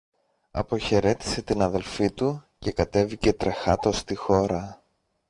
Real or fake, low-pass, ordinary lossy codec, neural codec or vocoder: real; 10.8 kHz; MP3, 64 kbps; none